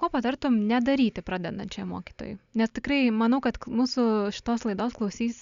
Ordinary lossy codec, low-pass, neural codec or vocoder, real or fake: Opus, 64 kbps; 7.2 kHz; none; real